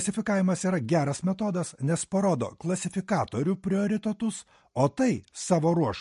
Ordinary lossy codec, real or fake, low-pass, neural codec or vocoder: MP3, 48 kbps; real; 10.8 kHz; none